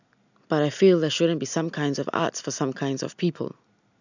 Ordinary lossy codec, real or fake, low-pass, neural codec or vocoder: none; real; 7.2 kHz; none